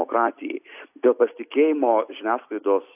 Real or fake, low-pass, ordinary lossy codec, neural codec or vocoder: real; 3.6 kHz; AAC, 32 kbps; none